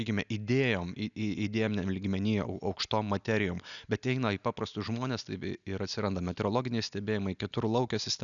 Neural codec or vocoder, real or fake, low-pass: none; real; 7.2 kHz